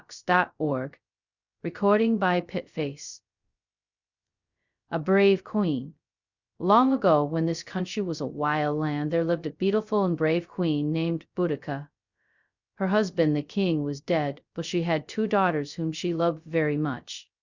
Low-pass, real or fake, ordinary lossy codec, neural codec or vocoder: 7.2 kHz; fake; Opus, 64 kbps; codec, 16 kHz, 0.2 kbps, FocalCodec